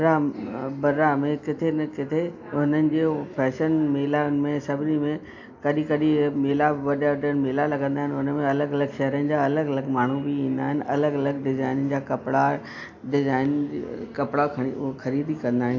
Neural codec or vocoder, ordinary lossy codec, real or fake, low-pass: none; AAC, 48 kbps; real; 7.2 kHz